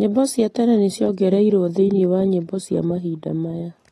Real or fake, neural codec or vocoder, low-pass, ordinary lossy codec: real; none; 19.8 kHz; AAC, 32 kbps